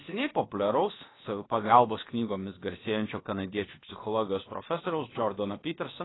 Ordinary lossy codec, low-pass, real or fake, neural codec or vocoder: AAC, 16 kbps; 7.2 kHz; fake; codec, 16 kHz, about 1 kbps, DyCAST, with the encoder's durations